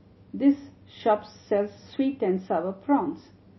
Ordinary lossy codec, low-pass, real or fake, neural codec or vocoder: MP3, 24 kbps; 7.2 kHz; real; none